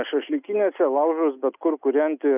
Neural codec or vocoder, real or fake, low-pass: none; real; 3.6 kHz